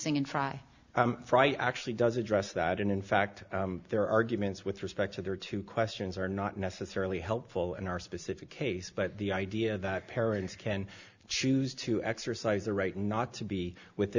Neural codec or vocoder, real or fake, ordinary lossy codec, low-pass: none; real; Opus, 64 kbps; 7.2 kHz